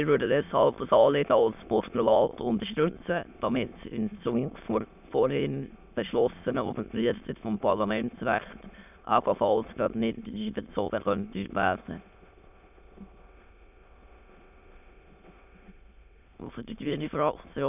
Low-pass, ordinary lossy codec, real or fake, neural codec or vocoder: 3.6 kHz; none; fake; autoencoder, 22.05 kHz, a latent of 192 numbers a frame, VITS, trained on many speakers